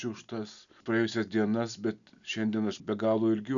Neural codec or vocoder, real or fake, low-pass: none; real; 7.2 kHz